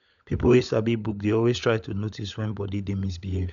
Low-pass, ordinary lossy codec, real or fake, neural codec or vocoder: 7.2 kHz; none; fake; codec, 16 kHz, 16 kbps, FunCodec, trained on LibriTTS, 50 frames a second